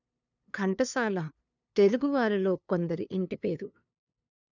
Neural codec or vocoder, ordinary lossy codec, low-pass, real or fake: codec, 16 kHz, 2 kbps, FunCodec, trained on LibriTTS, 25 frames a second; none; 7.2 kHz; fake